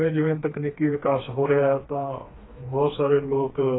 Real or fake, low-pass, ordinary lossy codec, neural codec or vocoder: fake; 7.2 kHz; AAC, 16 kbps; codec, 16 kHz, 2 kbps, FreqCodec, smaller model